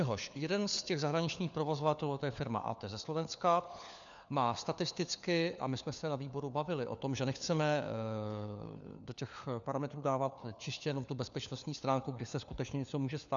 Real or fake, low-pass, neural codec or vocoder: fake; 7.2 kHz; codec, 16 kHz, 4 kbps, FunCodec, trained on LibriTTS, 50 frames a second